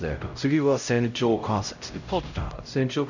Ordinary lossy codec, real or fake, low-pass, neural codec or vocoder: none; fake; 7.2 kHz; codec, 16 kHz, 0.5 kbps, X-Codec, HuBERT features, trained on LibriSpeech